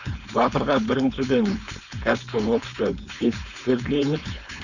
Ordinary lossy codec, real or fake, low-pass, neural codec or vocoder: none; fake; 7.2 kHz; codec, 16 kHz, 4.8 kbps, FACodec